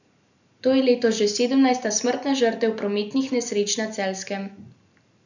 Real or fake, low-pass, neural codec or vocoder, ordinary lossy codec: real; 7.2 kHz; none; none